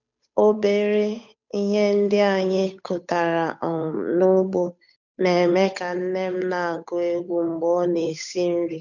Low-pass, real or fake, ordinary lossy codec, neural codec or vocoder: 7.2 kHz; fake; none; codec, 16 kHz, 8 kbps, FunCodec, trained on Chinese and English, 25 frames a second